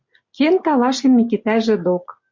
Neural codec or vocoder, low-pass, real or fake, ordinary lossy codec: none; 7.2 kHz; real; MP3, 48 kbps